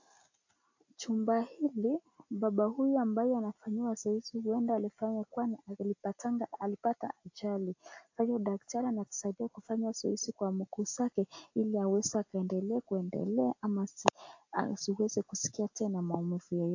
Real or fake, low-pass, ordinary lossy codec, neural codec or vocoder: real; 7.2 kHz; AAC, 48 kbps; none